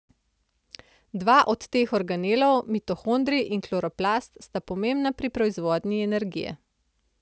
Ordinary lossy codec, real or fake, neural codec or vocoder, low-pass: none; real; none; none